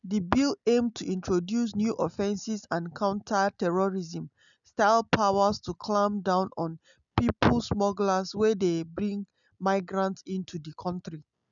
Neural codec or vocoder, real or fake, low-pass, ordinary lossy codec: none; real; 7.2 kHz; none